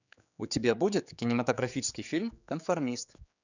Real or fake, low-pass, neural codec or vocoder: fake; 7.2 kHz; codec, 16 kHz, 4 kbps, X-Codec, HuBERT features, trained on general audio